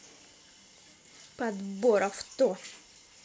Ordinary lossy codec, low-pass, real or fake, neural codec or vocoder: none; none; real; none